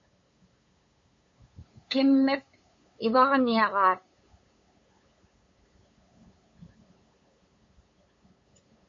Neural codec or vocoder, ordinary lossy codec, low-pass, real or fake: codec, 16 kHz, 8 kbps, FunCodec, trained on LibriTTS, 25 frames a second; MP3, 32 kbps; 7.2 kHz; fake